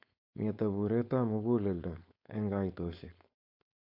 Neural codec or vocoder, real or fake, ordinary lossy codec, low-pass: codec, 16 kHz, 4.8 kbps, FACodec; fake; AAC, 48 kbps; 5.4 kHz